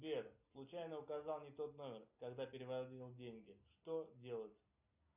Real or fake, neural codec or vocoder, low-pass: real; none; 3.6 kHz